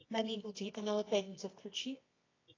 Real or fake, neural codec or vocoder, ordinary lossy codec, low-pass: fake; codec, 24 kHz, 0.9 kbps, WavTokenizer, medium music audio release; AAC, 32 kbps; 7.2 kHz